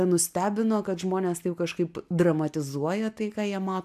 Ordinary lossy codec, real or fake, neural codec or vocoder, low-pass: AAC, 96 kbps; real; none; 14.4 kHz